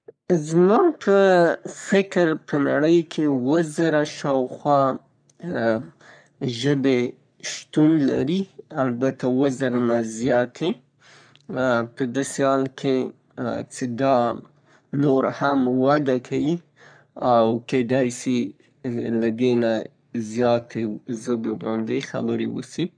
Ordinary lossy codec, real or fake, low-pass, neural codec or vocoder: none; fake; 9.9 kHz; codec, 44.1 kHz, 3.4 kbps, Pupu-Codec